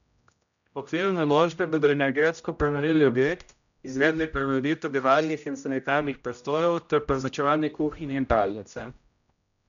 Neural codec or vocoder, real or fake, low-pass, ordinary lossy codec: codec, 16 kHz, 0.5 kbps, X-Codec, HuBERT features, trained on general audio; fake; 7.2 kHz; none